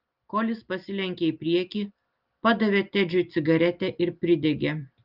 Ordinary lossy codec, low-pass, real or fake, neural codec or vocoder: Opus, 32 kbps; 5.4 kHz; real; none